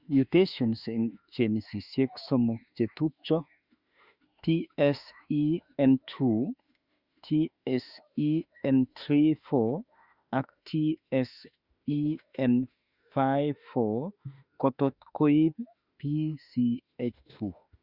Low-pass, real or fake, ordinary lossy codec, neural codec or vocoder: 5.4 kHz; fake; Opus, 64 kbps; autoencoder, 48 kHz, 32 numbers a frame, DAC-VAE, trained on Japanese speech